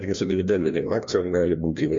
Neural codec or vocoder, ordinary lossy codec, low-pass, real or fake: codec, 16 kHz, 1 kbps, FreqCodec, larger model; MP3, 48 kbps; 7.2 kHz; fake